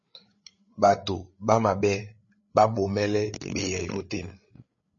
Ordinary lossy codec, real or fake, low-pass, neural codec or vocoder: MP3, 32 kbps; fake; 7.2 kHz; codec, 16 kHz, 8 kbps, FreqCodec, larger model